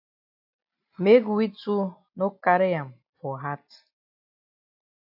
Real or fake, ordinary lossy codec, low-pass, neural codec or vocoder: real; AAC, 48 kbps; 5.4 kHz; none